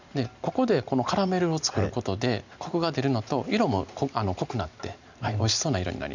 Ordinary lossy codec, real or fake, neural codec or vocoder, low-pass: none; real; none; 7.2 kHz